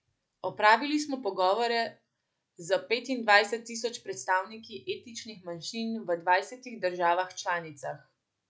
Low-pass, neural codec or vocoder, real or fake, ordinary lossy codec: none; none; real; none